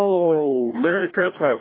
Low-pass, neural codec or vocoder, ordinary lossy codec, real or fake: 5.4 kHz; codec, 16 kHz, 1 kbps, FreqCodec, larger model; AAC, 24 kbps; fake